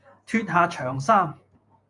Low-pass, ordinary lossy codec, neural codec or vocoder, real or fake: 10.8 kHz; AAC, 64 kbps; vocoder, 48 kHz, 128 mel bands, Vocos; fake